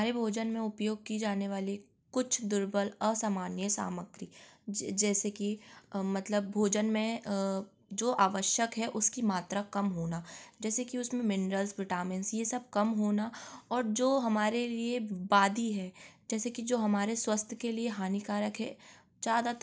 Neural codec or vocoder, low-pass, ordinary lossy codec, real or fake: none; none; none; real